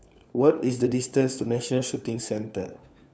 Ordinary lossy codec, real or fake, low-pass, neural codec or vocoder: none; fake; none; codec, 16 kHz, 4 kbps, FunCodec, trained on LibriTTS, 50 frames a second